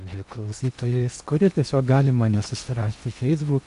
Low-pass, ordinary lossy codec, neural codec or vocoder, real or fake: 10.8 kHz; MP3, 64 kbps; codec, 16 kHz in and 24 kHz out, 0.8 kbps, FocalCodec, streaming, 65536 codes; fake